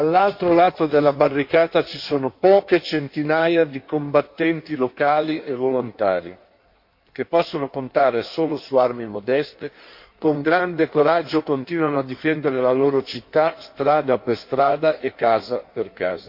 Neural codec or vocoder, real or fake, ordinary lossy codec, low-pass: codec, 16 kHz in and 24 kHz out, 1.1 kbps, FireRedTTS-2 codec; fake; MP3, 32 kbps; 5.4 kHz